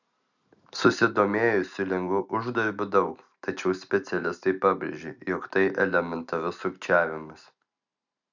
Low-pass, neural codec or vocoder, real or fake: 7.2 kHz; none; real